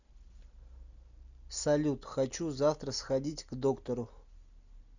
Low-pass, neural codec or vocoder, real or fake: 7.2 kHz; none; real